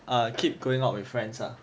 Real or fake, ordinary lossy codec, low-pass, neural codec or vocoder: real; none; none; none